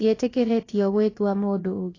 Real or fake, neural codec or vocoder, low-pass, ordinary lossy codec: fake; codec, 16 kHz, about 1 kbps, DyCAST, with the encoder's durations; 7.2 kHz; AAC, 32 kbps